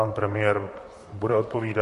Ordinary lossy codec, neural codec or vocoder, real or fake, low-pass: MP3, 48 kbps; vocoder, 44.1 kHz, 128 mel bands, Pupu-Vocoder; fake; 14.4 kHz